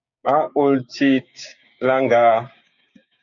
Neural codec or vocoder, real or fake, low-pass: codec, 16 kHz, 6 kbps, DAC; fake; 7.2 kHz